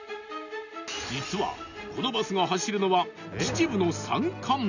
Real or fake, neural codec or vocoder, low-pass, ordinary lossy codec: real; none; 7.2 kHz; none